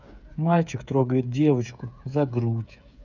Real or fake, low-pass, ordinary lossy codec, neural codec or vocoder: fake; 7.2 kHz; none; codec, 16 kHz, 8 kbps, FreqCodec, smaller model